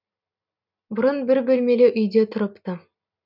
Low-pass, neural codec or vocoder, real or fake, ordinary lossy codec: 5.4 kHz; none; real; none